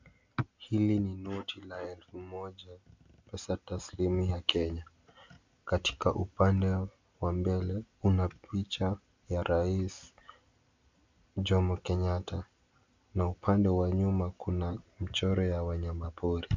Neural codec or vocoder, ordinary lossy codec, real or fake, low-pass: none; MP3, 64 kbps; real; 7.2 kHz